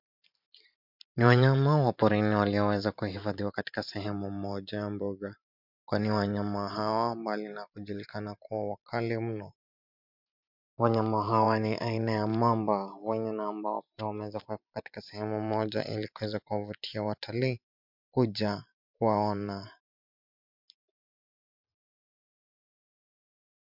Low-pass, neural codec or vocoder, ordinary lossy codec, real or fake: 5.4 kHz; vocoder, 44.1 kHz, 128 mel bands every 512 samples, BigVGAN v2; MP3, 48 kbps; fake